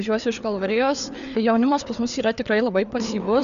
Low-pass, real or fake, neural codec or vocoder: 7.2 kHz; fake; codec, 16 kHz, 4 kbps, FreqCodec, larger model